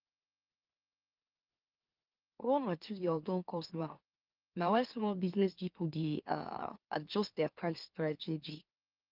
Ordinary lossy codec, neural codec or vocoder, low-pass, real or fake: Opus, 32 kbps; autoencoder, 44.1 kHz, a latent of 192 numbers a frame, MeloTTS; 5.4 kHz; fake